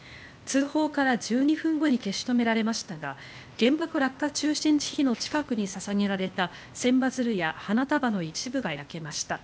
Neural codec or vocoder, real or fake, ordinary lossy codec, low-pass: codec, 16 kHz, 0.8 kbps, ZipCodec; fake; none; none